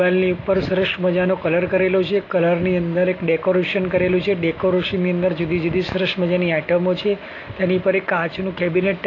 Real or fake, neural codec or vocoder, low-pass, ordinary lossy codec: real; none; 7.2 kHz; AAC, 32 kbps